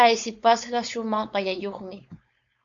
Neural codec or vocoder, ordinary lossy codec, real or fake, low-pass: codec, 16 kHz, 4.8 kbps, FACodec; AAC, 64 kbps; fake; 7.2 kHz